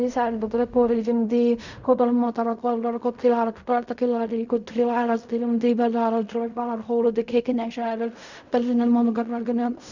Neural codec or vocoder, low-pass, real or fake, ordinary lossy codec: codec, 16 kHz in and 24 kHz out, 0.4 kbps, LongCat-Audio-Codec, fine tuned four codebook decoder; 7.2 kHz; fake; none